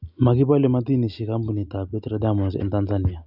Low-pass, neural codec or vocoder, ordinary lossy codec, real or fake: 5.4 kHz; none; none; real